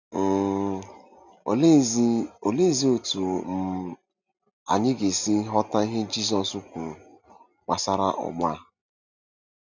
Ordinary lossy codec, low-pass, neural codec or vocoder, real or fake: none; 7.2 kHz; none; real